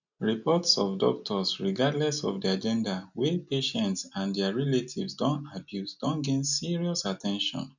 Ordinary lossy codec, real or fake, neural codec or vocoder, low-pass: none; real; none; 7.2 kHz